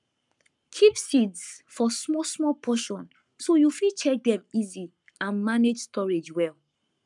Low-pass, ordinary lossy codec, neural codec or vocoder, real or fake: 10.8 kHz; none; codec, 44.1 kHz, 7.8 kbps, Pupu-Codec; fake